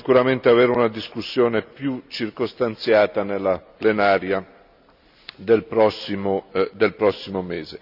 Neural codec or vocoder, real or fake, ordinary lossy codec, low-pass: none; real; none; 5.4 kHz